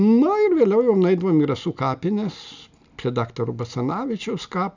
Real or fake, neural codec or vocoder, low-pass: real; none; 7.2 kHz